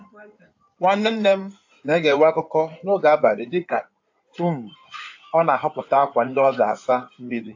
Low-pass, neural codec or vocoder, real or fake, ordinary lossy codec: 7.2 kHz; codec, 16 kHz in and 24 kHz out, 2.2 kbps, FireRedTTS-2 codec; fake; AAC, 48 kbps